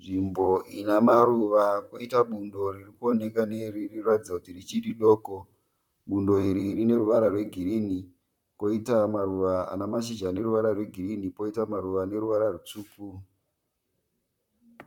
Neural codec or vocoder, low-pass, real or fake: vocoder, 44.1 kHz, 128 mel bands, Pupu-Vocoder; 19.8 kHz; fake